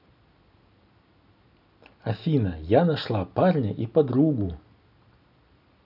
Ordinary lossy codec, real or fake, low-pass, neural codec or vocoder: none; real; 5.4 kHz; none